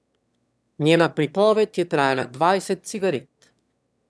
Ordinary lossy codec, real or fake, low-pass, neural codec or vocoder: none; fake; none; autoencoder, 22.05 kHz, a latent of 192 numbers a frame, VITS, trained on one speaker